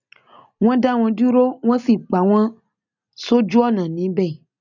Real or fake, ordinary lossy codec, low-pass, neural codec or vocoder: real; none; 7.2 kHz; none